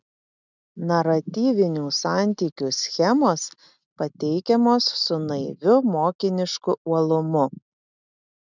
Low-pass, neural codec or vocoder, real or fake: 7.2 kHz; none; real